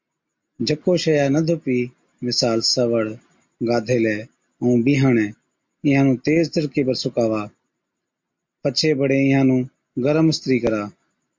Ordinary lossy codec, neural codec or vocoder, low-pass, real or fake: MP3, 48 kbps; none; 7.2 kHz; real